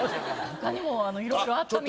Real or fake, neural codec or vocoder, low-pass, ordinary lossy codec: real; none; none; none